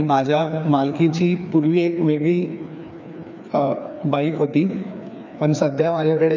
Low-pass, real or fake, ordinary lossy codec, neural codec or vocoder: 7.2 kHz; fake; none; codec, 16 kHz, 2 kbps, FreqCodec, larger model